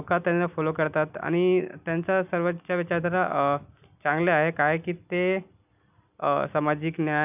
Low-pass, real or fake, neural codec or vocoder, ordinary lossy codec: 3.6 kHz; real; none; none